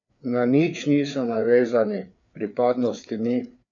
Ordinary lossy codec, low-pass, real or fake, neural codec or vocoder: none; 7.2 kHz; fake; codec, 16 kHz, 4 kbps, FreqCodec, larger model